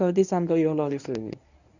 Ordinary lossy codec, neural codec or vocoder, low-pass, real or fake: none; codec, 24 kHz, 0.9 kbps, WavTokenizer, medium speech release version 1; 7.2 kHz; fake